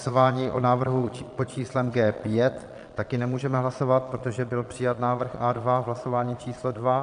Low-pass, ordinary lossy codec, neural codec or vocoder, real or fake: 9.9 kHz; MP3, 96 kbps; vocoder, 22.05 kHz, 80 mel bands, Vocos; fake